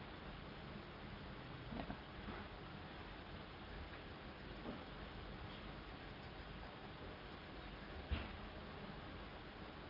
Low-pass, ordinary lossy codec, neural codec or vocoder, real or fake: 5.4 kHz; Opus, 24 kbps; none; real